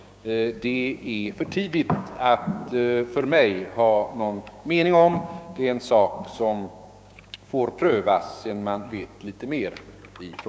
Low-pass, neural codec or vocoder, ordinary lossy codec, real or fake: none; codec, 16 kHz, 6 kbps, DAC; none; fake